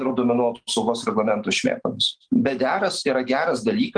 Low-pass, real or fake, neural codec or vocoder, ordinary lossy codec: 9.9 kHz; real; none; Opus, 24 kbps